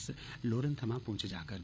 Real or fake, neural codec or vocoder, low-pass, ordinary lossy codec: fake; codec, 16 kHz, 8 kbps, FreqCodec, larger model; none; none